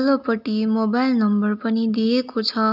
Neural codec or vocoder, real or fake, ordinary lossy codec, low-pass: none; real; none; 5.4 kHz